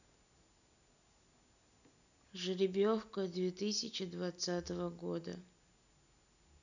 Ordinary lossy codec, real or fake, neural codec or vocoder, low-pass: none; real; none; 7.2 kHz